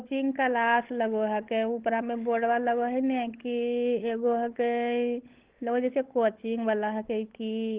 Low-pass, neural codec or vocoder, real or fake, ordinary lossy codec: 3.6 kHz; codec, 16 kHz, 16 kbps, FunCodec, trained on LibriTTS, 50 frames a second; fake; Opus, 16 kbps